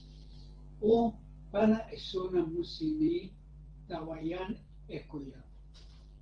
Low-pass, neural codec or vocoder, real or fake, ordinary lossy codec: 9.9 kHz; vocoder, 44.1 kHz, 128 mel bands every 512 samples, BigVGAN v2; fake; Opus, 16 kbps